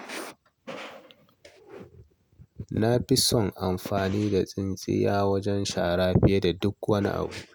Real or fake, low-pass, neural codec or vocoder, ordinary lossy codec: real; none; none; none